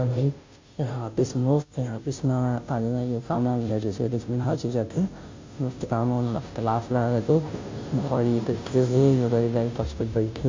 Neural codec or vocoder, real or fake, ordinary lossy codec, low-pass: codec, 16 kHz, 0.5 kbps, FunCodec, trained on Chinese and English, 25 frames a second; fake; MP3, 64 kbps; 7.2 kHz